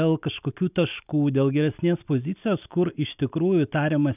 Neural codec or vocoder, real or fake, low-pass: none; real; 3.6 kHz